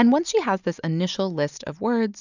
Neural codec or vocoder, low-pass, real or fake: none; 7.2 kHz; real